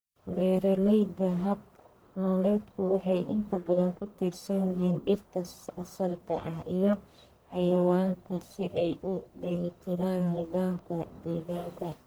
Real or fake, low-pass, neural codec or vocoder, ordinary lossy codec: fake; none; codec, 44.1 kHz, 1.7 kbps, Pupu-Codec; none